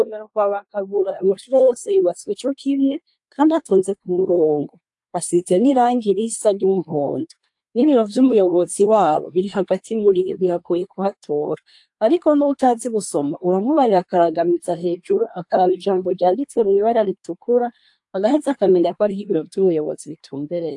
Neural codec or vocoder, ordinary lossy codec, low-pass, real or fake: codec, 24 kHz, 1 kbps, SNAC; AAC, 64 kbps; 10.8 kHz; fake